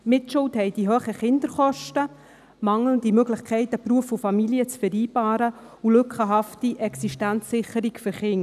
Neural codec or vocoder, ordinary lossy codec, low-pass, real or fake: none; none; 14.4 kHz; real